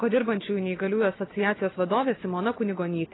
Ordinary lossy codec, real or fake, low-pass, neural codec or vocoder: AAC, 16 kbps; real; 7.2 kHz; none